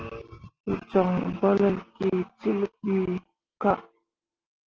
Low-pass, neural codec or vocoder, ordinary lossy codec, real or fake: 7.2 kHz; none; Opus, 16 kbps; real